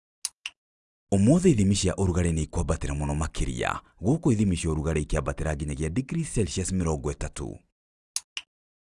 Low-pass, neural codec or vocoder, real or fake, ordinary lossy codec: 10.8 kHz; none; real; Opus, 32 kbps